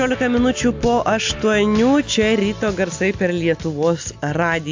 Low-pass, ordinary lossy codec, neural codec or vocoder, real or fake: 7.2 kHz; AAC, 48 kbps; none; real